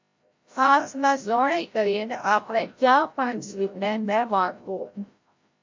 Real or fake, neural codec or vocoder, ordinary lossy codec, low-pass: fake; codec, 16 kHz, 0.5 kbps, FreqCodec, larger model; MP3, 48 kbps; 7.2 kHz